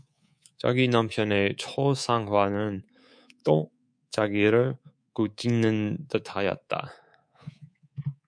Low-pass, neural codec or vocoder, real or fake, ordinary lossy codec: 9.9 kHz; codec, 24 kHz, 3.1 kbps, DualCodec; fake; MP3, 64 kbps